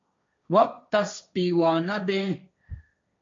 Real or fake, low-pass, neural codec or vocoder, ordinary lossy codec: fake; 7.2 kHz; codec, 16 kHz, 1.1 kbps, Voila-Tokenizer; MP3, 48 kbps